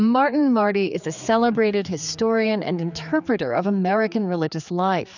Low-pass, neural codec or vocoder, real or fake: 7.2 kHz; codec, 16 kHz, 4 kbps, X-Codec, HuBERT features, trained on general audio; fake